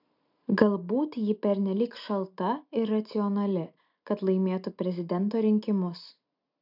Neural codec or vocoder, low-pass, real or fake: none; 5.4 kHz; real